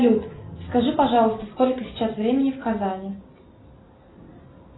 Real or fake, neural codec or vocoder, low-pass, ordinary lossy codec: real; none; 7.2 kHz; AAC, 16 kbps